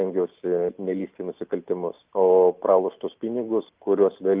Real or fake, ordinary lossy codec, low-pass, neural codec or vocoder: real; Opus, 32 kbps; 3.6 kHz; none